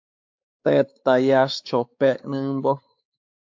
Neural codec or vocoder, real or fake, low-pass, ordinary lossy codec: codec, 16 kHz, 4 kbps, X-Codec, WavLM features, trained on Multilingual LibriSpeech; fake; 7.2 kHz; AAC, 48 kbps